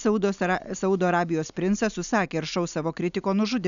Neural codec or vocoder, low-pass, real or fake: none; 7.2 kHz; real